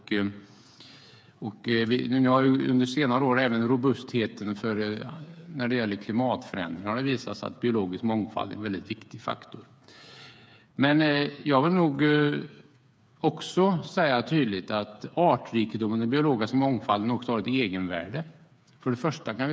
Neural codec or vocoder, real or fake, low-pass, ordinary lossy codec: codec, 16 kHz, 8 kbps, FreqCodec, smaller model; fake; none; none